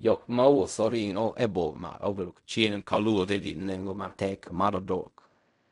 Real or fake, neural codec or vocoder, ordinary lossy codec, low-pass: fake; codec, 16 kHz in and 24 kHz out, 0.4 kbps, LongCat-Audio-Codec, fine tuned four codebook decoder; none; 10.8 kHz